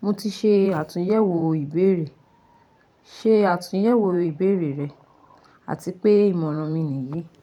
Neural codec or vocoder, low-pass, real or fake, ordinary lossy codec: vocoder, 44.1 kHz, 128 mel bands every 512 samples, BigVGAN v2; 19.8 kHz; fake; none